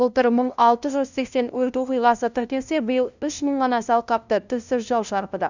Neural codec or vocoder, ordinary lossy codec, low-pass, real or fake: codec, 16 kHz, 0.5 kbps, FunCodec, trained on LibriTTS, 25 frames a second; none; 7.2 kHz; fake